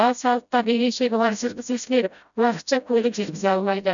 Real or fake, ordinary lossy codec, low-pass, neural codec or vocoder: fake; none; 7.2 kHz; codec, 16 kHz, 0.5 kbps, FreqCodec, smaller model